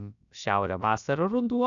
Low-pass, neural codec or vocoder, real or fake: 7.2 kHz; codec, 16 kHz, about 1 kbps, DyCAST, with the encoder's durations; fake